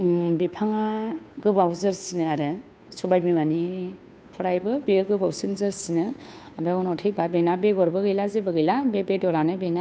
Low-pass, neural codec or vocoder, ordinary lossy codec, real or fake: none; codec, 16 kHz, 2 kbps, FunCodec, trained on Chinese and English, 25 frames a second; none; fake